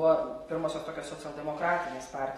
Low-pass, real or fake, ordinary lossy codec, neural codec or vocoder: 19.8 kHz; real; AAC, 32 kbps; none